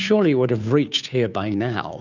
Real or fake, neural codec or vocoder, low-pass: fake; codec, 16 kHz, 2 kbps, FunCodec, trained on Chinese and English, 25 frames a second; 7.2 kHz